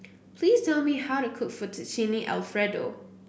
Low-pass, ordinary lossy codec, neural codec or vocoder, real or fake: none; none; none; real